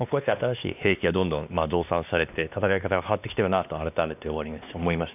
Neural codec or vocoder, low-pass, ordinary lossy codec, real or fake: codec, 16 kHz, 2 kbps, X-Codec, WavLM features, trained on Multilingual LibriSpeech; 3.6 kHz; none; fake